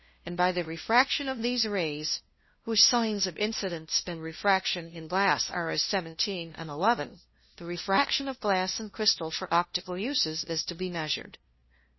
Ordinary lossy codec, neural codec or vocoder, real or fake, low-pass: MP3, 24 kbps; codec, 16 kHz, 0.5 kbps, FunCodec, trained on LibriTTS, 25 frames a second; fake; 7.2 kHz